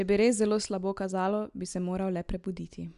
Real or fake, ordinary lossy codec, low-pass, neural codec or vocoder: real; Opus, 64 kbps; 10.8 kHz; none